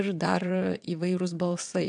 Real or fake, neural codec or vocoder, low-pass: fake; vocoder, 22.05 kHz, 80 mel bands, WaveNeXt; 9.9 kHz